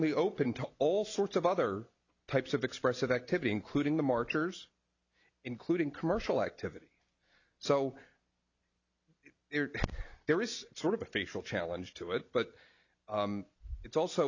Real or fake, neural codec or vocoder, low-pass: real; none; 7.2 kHz